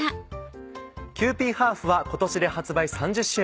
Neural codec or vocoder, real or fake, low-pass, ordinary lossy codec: none; real; none; none